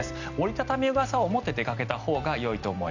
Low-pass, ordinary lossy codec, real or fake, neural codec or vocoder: 7.2 kHz; none; real; none